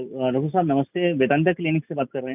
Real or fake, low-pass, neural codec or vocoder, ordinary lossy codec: real; 3.6 kHz; none; none